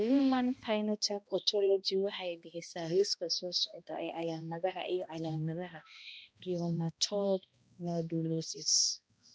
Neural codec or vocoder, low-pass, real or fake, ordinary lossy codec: codec, 16 kHz, 1 kbps, X-Codec, HuBERT features, trained on balanced general audio; none; fake; none